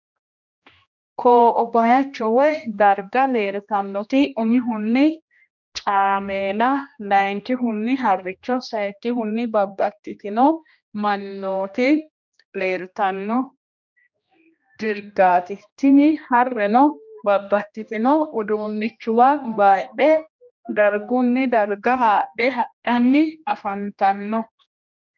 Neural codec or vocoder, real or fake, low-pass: codec, 16 kHz, 1 kbps, X-Codec, HuBERT features, trained on general audio; fake; 7.2 kHz